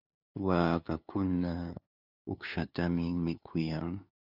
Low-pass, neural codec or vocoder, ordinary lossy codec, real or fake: 5.4 kHz; codec, 16 kHz, 2 kbps, FunCodec, trained on LibriTTS, 25 frames a second; Opus, 64 kbps; fake